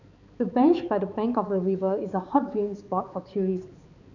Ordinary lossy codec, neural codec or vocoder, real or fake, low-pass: none; codec, 16 kHz, 4 kbps, X-Codec, HuBERT features, trained on balanced general audio; fake; 7.2 kHz